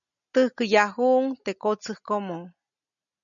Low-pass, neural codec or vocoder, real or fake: 7.2 kHz; none; real